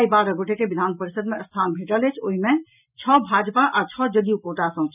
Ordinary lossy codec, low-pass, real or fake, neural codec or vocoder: none; 3.6 kHz; real; none